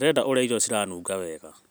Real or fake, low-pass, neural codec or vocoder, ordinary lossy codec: real; none; none; none